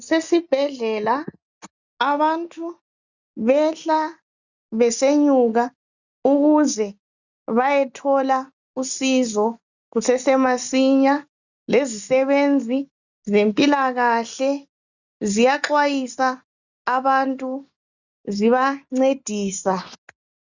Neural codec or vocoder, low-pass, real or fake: none; 7.2 kHz; real